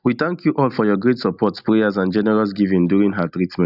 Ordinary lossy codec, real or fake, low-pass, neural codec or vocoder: none; real; 5.4 kHz; none